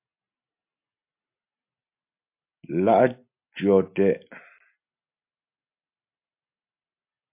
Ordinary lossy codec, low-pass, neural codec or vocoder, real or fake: MP3, 32 kbps; 3.6 kHz; none; real